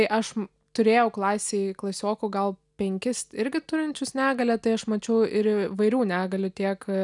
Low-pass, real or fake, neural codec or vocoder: 10.8 kHz; real; none